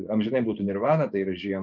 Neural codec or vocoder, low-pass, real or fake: vocoder, 24 kHz, 100 mel bands, Vocos; 7.2 kHz; fake